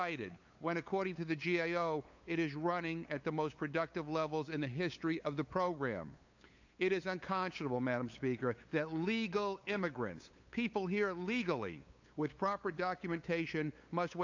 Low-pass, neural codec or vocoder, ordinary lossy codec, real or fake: 7.2 kHz; codec, 16 kHz, 8 kbps, FunCodec, trained on LibriTTS, 25 frames a second; AAC, 48 kbps; fake